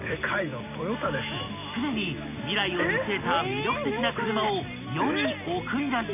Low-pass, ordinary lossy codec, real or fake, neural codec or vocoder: 3.6 kHz; none; real; none